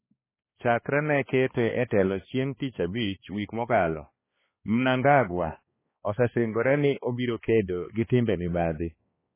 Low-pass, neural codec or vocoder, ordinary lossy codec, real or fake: 3.6 kHz; codec, 16 kHz, 2 kbps, X-Codec, HuBERT features, trained on balanced general audio; MP3, 16 kbps; fake